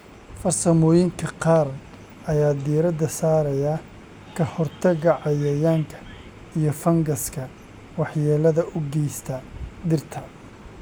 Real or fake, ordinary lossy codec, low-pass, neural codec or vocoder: real; none; none; none